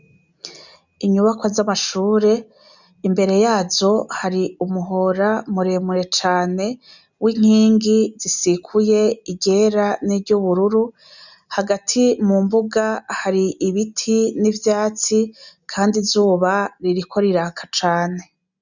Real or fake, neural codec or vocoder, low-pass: real; none; 7.2 kHz